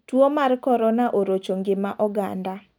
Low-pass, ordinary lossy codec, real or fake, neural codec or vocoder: 19.8 kHz; none; real; none